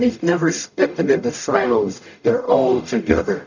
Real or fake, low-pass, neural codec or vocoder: fake; 7.2 kHz; codec, 44.1 kHz, 0.9 kbps, DAC